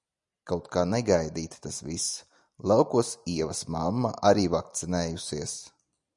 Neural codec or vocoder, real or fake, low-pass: none; real; 10.8 kHz